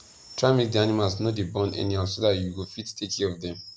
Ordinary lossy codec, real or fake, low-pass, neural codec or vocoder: none; real; none; none